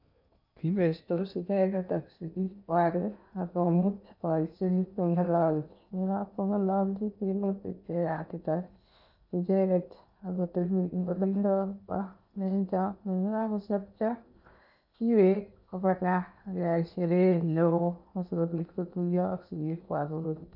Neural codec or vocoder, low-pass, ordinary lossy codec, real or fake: codec, 16 kHz in and 24 kHz out, 0.8 kbps, FocalCodec, streaming, 65536 codes; 5.4 kHz; none; fake